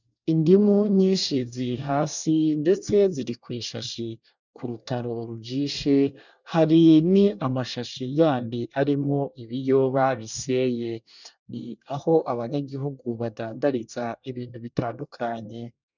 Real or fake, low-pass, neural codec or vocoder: fake; 7.2 kHz; codec, 24 kHz, 1 kbps, SNAC